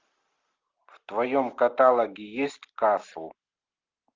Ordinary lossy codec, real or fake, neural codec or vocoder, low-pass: Opus, 24 kbps; real; none; 7.2 kHz